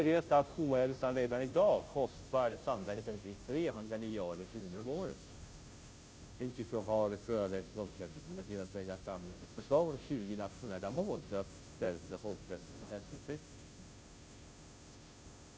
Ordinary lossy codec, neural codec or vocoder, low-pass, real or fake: none; codec, 16 kHz, 0.5 kbps, FunCodec, trained on Chinese and English, 25 frames a second; none; fake